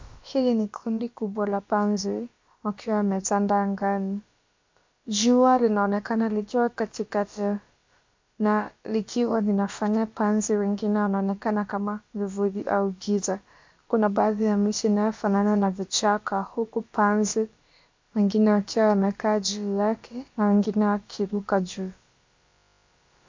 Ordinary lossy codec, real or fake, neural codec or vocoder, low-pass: MP3, 48 kbps; fake; codec, 16 kHz, about 1 kbps, DyCAST, with the encoder's durations; 7.2 kHz